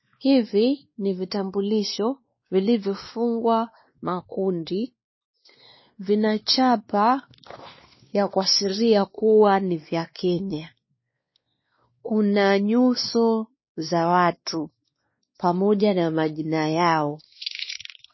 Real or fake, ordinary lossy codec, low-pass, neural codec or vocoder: fake; MP3, 24 kbps; 7.2 kHz; codec, 16 kHz, 2 kbps, X-Codec, WavLM features, trained on Multilingual LibriSpeech